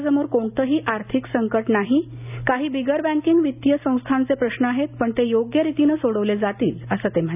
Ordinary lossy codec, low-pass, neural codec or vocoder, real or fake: none; 3.6 kHz; none; real